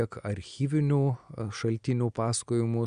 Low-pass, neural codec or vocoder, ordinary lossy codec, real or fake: 9.9 kHz; none; Opus, 64 kbps; real